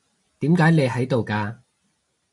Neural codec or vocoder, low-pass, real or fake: none; 10.8 kHz; real